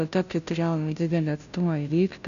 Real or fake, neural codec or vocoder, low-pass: fake; codec, 16 kHz, 0.5 kbps, FunCodec, trained on Chinese and English, 25 frames a second; 7.2 kHz